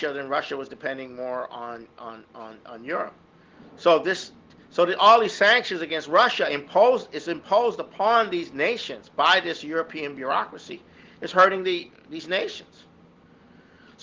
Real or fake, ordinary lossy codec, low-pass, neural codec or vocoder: real; Opus, 16 kbps; 7.2 kHz; none